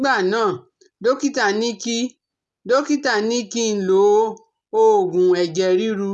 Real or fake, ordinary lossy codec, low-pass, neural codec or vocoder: real; none; none; none